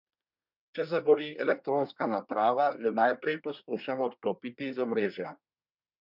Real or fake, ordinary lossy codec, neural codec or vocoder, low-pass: fake; none; codec, 24 kHz, 1 kbps, SNAC; 5.4 kHz